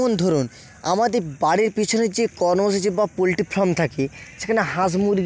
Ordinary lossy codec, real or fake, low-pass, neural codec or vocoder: none; real; none; none